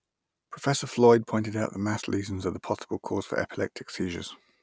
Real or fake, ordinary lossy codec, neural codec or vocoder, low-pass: real; none; none; none